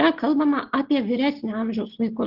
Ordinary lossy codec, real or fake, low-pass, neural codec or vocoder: Opus, 16 kbps; real; 5.4 kHz; none